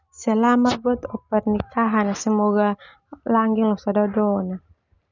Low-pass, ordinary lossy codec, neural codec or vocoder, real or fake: 7.2 kHz; none; none; real